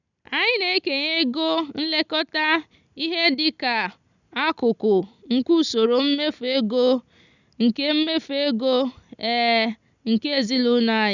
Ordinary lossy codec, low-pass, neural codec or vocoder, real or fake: none; 7.2 kHz; none; real